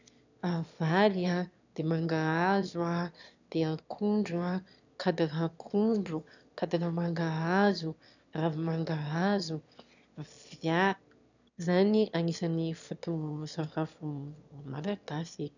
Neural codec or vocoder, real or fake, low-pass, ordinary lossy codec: autoencoder, 22.05 kHz, a latent of 192 numbers a frame, VITS, trained on one speaker; fake; 7.2 kHz; none